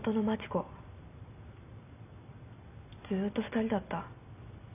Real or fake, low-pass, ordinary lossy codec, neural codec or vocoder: real; 3.6 kHz; none; none